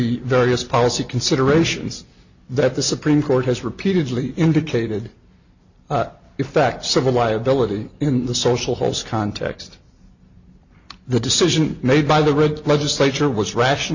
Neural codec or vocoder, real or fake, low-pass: none; real; 7.2 kHz